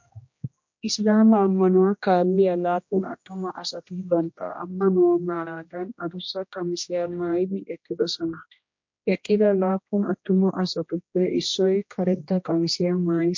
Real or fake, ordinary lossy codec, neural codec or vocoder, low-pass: fake; MP3, 48 kbps; codec, 16 kHz, 1 kbps, X-Codec, HuBERT features, trained on general audio; 7.2 kHz